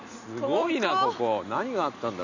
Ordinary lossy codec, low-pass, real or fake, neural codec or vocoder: none; 7.2 kHz; real; none